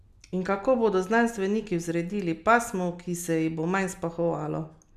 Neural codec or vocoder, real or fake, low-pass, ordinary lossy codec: none; real; 14.4 kHz; none